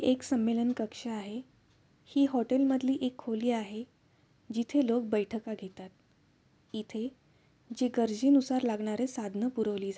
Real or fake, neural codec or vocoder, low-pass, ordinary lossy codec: real; none; none; none